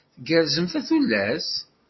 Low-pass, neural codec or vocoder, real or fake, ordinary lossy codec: 7.2 kHz; none; real; MP3, 24 kbps